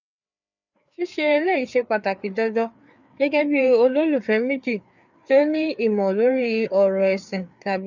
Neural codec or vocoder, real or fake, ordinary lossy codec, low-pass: codec, 16 kHz, 4 kbps, FreqCodec, larger model; fake; none; 7.2 kHz